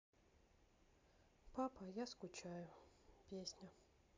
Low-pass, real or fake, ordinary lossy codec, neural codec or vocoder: 7.2 kHz; real; MP3, 64 kbps; none